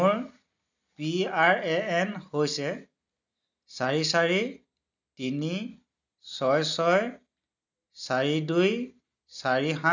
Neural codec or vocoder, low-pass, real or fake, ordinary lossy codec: none; 7.2 kHz; real; none